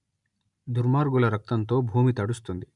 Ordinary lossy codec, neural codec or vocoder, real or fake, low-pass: MP3, 96 kbps; none; real; 10.8 kHz